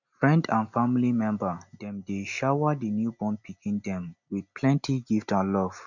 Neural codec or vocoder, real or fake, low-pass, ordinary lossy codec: none; real; 7.2 kHz; none